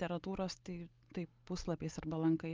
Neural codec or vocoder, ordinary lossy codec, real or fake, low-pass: none; Opus, 24 kbps; real; 7.2 kHz